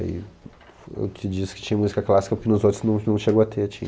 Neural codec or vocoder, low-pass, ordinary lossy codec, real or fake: none; none; none; real